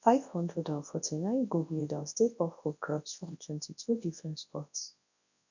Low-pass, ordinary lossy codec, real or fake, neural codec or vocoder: 7.2 kHz; none; fake; codec, 24 kHz, 0.9 kbps, WavTokenizer, large speech release